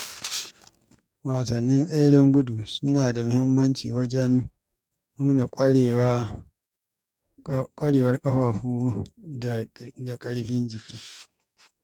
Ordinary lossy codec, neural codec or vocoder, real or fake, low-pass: none; codec, 44.1 kHz, 2.6 kbps, DAC; fake; 19.8 kHz